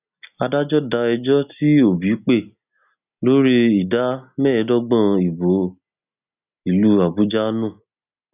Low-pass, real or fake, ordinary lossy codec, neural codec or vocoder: 3.6 kHz; real; none; none